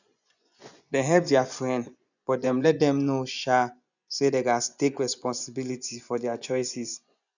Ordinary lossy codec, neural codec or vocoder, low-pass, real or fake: none; vocoder, 24 kHz, 100 mel bands, Vocos; 7.2 kHz; fake